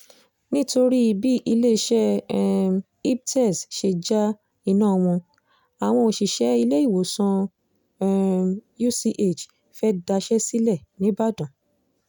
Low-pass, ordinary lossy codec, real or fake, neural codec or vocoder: none; none; real; none